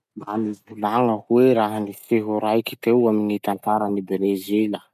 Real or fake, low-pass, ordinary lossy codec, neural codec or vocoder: real; 14.4 kHz; none; none